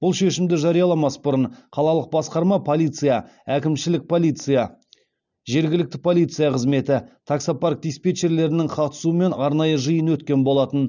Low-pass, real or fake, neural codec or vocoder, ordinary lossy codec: 7.2 kHz; real; none; none